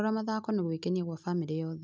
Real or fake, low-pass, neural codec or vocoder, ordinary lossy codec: real; none; none; none